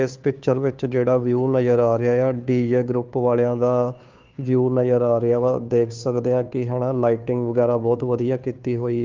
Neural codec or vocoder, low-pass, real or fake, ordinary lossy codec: codec, 16 kHz, 2 kbps, FunCodec, trained on Chinese and English, 25 frames a second; 7.2 kHz; fake; Opus, 24 kbps